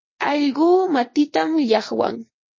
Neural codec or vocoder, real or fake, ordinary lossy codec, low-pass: vocoder, 22.05 kHz, 80 mel bands, WaveNeXt; fake; MP3, 32 kbps; 7.2 kHz